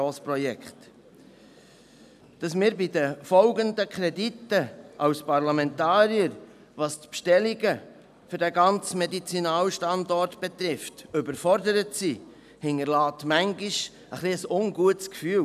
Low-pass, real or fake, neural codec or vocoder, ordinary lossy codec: 14.4 kHz; real; none; none